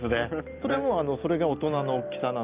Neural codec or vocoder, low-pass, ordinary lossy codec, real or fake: none; 3.6 kHz; Opus, 32 kbps; real